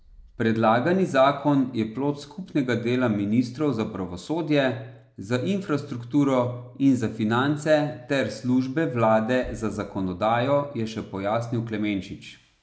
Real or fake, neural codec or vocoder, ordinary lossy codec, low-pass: real; none; none; none